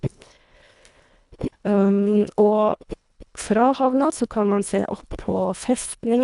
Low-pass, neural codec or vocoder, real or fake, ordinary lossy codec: 10.8 kHz; codec, 24 kHz, 1.5 kbps, HILCodec; fake; none